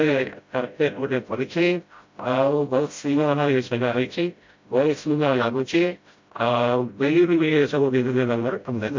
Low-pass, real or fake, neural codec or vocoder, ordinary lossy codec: 7.2 kHz; fake; codec, 16 kHz, 0.5 kbps, FreqCodec, smaller model; MP3, 48 kbps